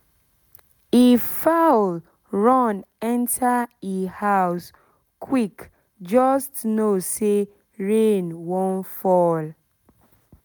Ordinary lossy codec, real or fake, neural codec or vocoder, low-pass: none; real; none; none